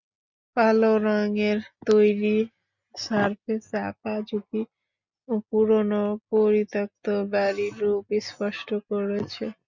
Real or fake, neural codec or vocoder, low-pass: real; none; 7.2 kHz